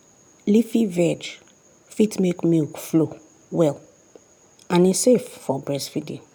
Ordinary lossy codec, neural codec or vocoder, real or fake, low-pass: none; none; real; none